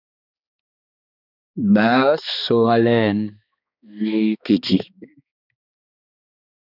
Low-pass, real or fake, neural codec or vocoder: 5.4 kHz; fake; codec, 16 kHz, 2 kbps, X-Codec, HuBERT features, trained on balanced general audio